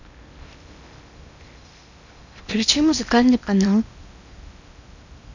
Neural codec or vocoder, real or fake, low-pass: codec, 16 kHz in and 24 kHz out, 0.8 kbps, FocalCodec, streaming, 65536 codes; fake; 7.2 kHz